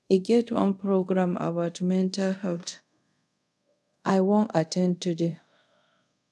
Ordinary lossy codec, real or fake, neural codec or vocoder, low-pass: none; fake; codec, 24 kHz, 0.5 kbps, DualCodec; none